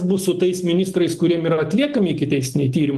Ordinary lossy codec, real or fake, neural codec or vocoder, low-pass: Opus, 24 kbps; real; none; 14.4 kHz